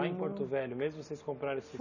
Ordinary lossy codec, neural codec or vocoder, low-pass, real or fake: none; none; 7.2 kHz; real